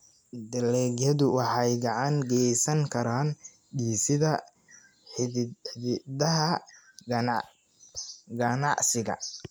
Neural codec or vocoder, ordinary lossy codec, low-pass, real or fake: none; none; none; real